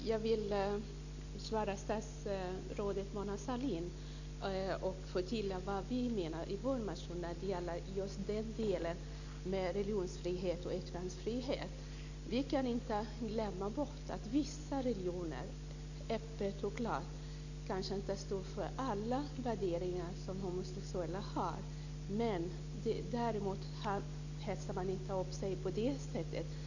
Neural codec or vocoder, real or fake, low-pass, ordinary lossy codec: none; real; 7.2 kHz; none